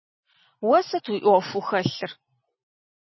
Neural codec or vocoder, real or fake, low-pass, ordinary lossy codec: none; real; 7.2 kHz; MP3, 24 kbps